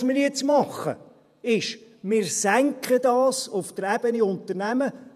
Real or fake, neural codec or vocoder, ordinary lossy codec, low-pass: fake; vocoder, 48 kHz, 128 mel bands, Vocos; none; 14.4 kHz